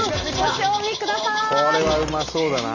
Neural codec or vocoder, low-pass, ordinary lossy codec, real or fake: none; 7.2 kHz; AAC, 48 kbps; real